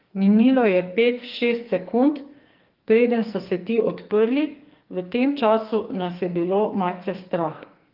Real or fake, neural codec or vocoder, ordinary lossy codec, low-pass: fake; codec, 44.1 kHz, 2.6 kbps, SNAC; Opus, 32 kbps; 5.4 kHz